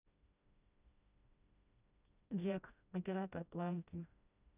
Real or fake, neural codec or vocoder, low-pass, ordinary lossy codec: fake; codec, 16 kHz, 1 kbps, FreqCodec, smaller model; 3.6 kHz; none